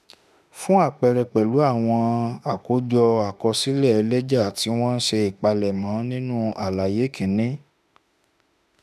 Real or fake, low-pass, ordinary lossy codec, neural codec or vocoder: fake; 14.4 kHz; none; autoencoder, 48 kHz, 32 numbers a frame, DAC-VAE, trained on Japanese speech